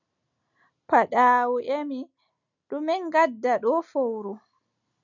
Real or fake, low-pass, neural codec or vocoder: real; 7.2 kHz; none